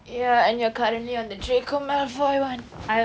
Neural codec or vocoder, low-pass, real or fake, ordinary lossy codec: none; none; real; none